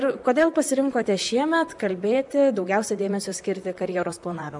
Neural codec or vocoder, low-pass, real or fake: vocoder, 44.1 kHz, 128 mel bands, Pupu-Vocoder; 10.8 kHz; fake